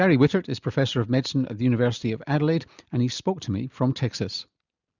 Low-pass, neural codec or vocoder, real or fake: 7.2 kHz; none; real